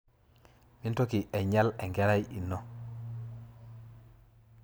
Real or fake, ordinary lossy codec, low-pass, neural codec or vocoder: real; none; none; none